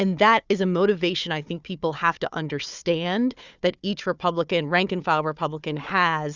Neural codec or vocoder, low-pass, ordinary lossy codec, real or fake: codec, 16 kHz, 4 kbps, FunCodec, trained on Chinese and English, 50 frames a second; 7.2 kHz; Opus, 64 kbps; fake